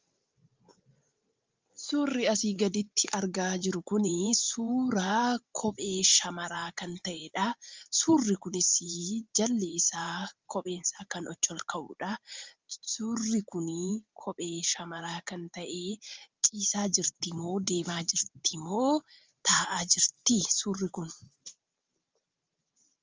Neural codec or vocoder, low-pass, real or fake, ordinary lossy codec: vocoder, 44.1 kHz, 128 mel bands every 512 samples, BigVGAN v2; 7.2 kHz; fake; Opus, 32 kbps